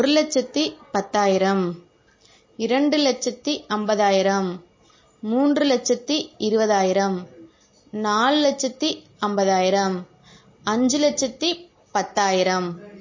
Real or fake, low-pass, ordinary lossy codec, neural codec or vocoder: real; 7.2 kHz; MP3, 32 kbps; none